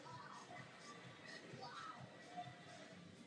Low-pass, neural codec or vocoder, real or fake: 9.9 kHz; none; real